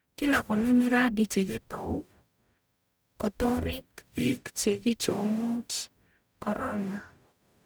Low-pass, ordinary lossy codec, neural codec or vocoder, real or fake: none; none; codec, 44.1 kHz, 0.9 kbps, DAC; fake